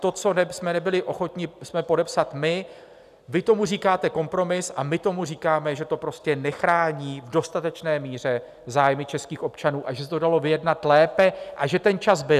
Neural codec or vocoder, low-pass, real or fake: none; 14.4 kHz; real